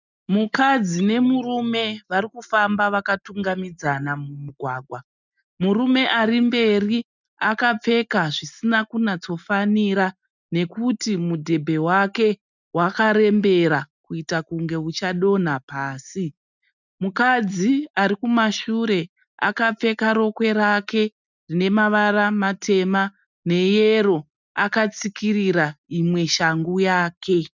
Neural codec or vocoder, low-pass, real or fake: none; 7.2 kHz; real